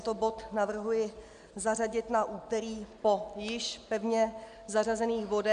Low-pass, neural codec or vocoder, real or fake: 9.9 kHz; none; real